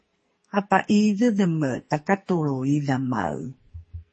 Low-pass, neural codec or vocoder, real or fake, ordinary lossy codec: 10.8 kHz; codec, 44.1 kHz, 2.6 kbps, SNAC; fake; MP3, 32 kbps